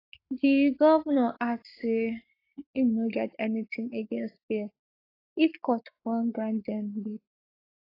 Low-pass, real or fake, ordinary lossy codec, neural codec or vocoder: 5.4 kHz; fake; AAC, 24 kbps; codec, 44.1 kHz, 7.8 kbps, Pupu-Codec